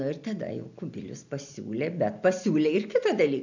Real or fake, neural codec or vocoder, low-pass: real; none; 7.2 kHz